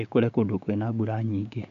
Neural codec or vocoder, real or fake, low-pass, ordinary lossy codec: codec, 16 kHz, 6 kbps, DAC; fake; 7.2 kHz; MP3, 64 kbps